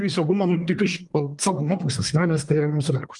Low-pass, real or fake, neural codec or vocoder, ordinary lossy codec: 10.8 kHz; fake; codec, 24 kHz, 1 kbps, SNAC; Opus, 32 kbps